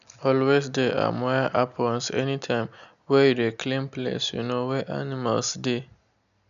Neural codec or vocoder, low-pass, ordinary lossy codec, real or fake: none; 7.2 kHz; none; real